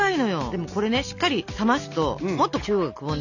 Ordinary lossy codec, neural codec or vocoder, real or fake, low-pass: none; none; real; 7.2 kHz